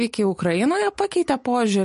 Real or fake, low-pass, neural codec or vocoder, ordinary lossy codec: real; 10.8 kHz; none; MP3, 48 kbps